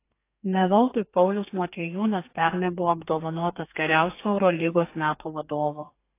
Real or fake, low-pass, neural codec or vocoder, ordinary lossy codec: fake; 3.6 kHz; codec, 32 kHz, 1.9 kbps, SNAC; AAC, 24 kbps